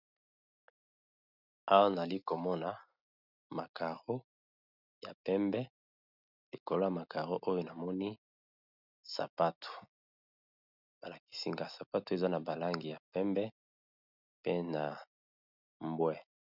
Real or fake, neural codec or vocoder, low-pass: real; none; 5.4 kHz